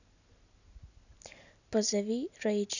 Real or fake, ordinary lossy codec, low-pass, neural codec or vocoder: real; none; 7.2 kHz; none